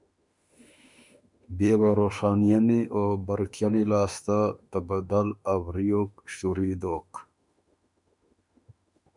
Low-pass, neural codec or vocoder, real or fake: 10.8 kHz; autoencoder, 48 kHz, 32 numbers a frame, DAC-VAE, trained on Japanese speech; fake